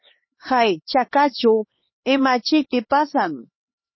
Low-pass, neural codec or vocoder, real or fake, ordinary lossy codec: 7.2 kHz; codec, 16 kHz, 2 kbps, X-Codec, HuBERT features, trained on LibriSpeech; fake; MP3, 24 kbps